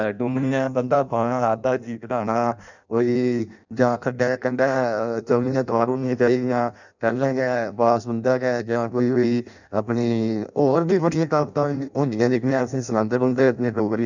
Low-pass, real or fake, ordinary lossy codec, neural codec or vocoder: 7.2 kHz; fake; none; codec, 16 kHz in and 24 kHz out, 0.6 kbps, FireRedTTS-2 codec